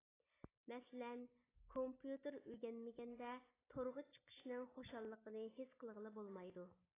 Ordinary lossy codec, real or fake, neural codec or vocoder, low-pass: AAC, 16 kbps; real; none; 3.6 kHz